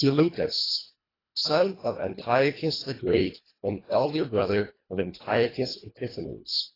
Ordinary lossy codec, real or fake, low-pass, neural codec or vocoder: AAC, 24 kbps; fake; 5.4 kHz; codec, 24 kHz, 1.5 kbps, HILCodec